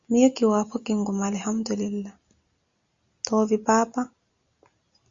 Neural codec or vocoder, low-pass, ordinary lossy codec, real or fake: none; 7.2 kHz; Opus, 64 kbps; real